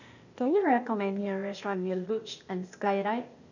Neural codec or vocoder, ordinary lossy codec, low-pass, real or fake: codec, 16 kHz, 0.8 kbps, ZipCodec; none; 7.2 kHz; fake